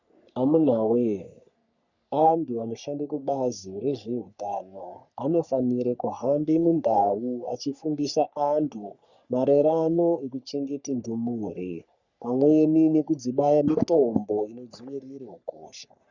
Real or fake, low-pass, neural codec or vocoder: fake; 7.2 kHz; codec, 44.1 kHz, 3.4 kbps, Pupu-Codec